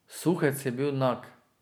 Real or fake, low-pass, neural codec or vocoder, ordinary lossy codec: real; none; none; none